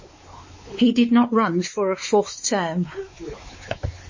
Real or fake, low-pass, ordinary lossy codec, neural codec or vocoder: fake; 7.2 kHz; MP3, 32 kbps; codec, 16 kHz, 4 kbps, X-Codec, WavLM features, trained on Multilingual LibriSpeech